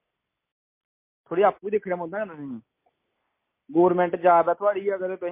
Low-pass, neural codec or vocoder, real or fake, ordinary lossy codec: 3.6 kHz; none; real; MP3, 24 kbps